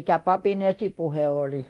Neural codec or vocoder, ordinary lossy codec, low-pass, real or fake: codec, 24 kHz, 0.9 kbps, DualCodec; Opus, 24 kbps; 10.8 kHz; fake